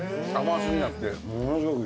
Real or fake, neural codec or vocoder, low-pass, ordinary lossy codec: real; none; none; none